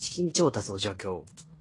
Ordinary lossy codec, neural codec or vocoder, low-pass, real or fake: AAC, 32 kbps; codec, 16 kHz in and 24 kHz out, 0.9 kbps, LongCat-Audio-Codec, fine tuned four codebook decoder; 10.8 kHz; fake